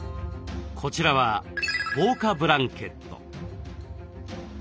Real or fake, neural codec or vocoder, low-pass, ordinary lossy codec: real; none; none; none